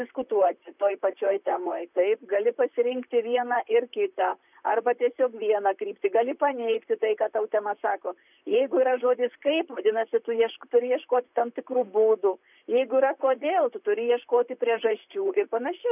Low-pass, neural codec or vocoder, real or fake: 3.6 kHz; vocoder, 44.1 kHz, 128 mel bands, Pupu-Vocoder; fake